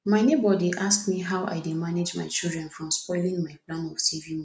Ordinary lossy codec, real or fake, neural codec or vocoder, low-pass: none; real; none; none